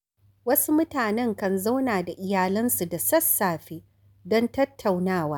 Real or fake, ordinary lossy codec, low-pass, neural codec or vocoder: real; none; none; none